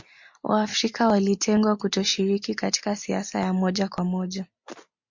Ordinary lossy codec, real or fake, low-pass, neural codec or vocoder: MP3, 48 kbps; real; 7.2 kHz; none